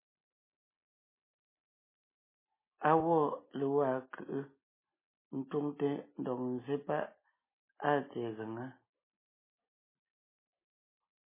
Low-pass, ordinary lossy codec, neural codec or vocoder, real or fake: 3.6 kHz; MP3, 16 kbps; none; real